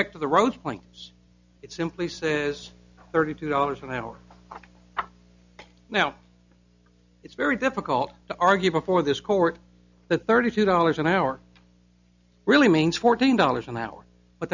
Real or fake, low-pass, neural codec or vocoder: real; 7.2 kHz; none